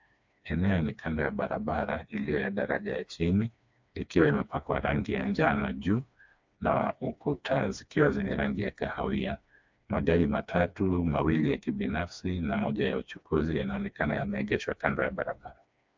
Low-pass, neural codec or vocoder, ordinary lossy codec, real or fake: 7.2 kHz; codec, 16 kHz, 2 kbps, FreqCodec, smaller model; MP3, 64 kbps; fake